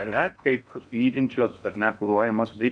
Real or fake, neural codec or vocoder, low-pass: fake; codec, 16 kHz in and 24 kHz out, 0.8 kbps, FocalCodec, streaming, 65536 codes; 9.9 kHz